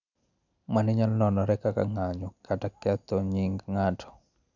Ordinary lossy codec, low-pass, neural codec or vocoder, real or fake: none; 7.2 kHz; none; real